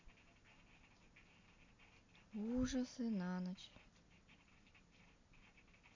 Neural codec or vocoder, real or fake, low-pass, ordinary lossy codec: none; real; 7.2 kHz; AAC, 48 kbps